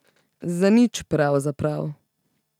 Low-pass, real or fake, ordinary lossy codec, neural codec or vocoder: 19.8 kHz; real; none; none